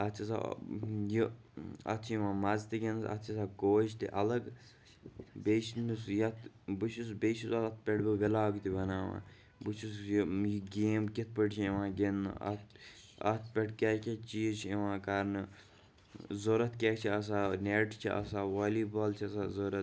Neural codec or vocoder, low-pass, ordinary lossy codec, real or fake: none; none; none; real